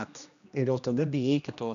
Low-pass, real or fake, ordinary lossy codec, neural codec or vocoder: 7.2 kHz; fake; AAC, 96 kbps; codec, 16 kHz, 1 kbps, X-Codec, HuBERT features, trained on general audio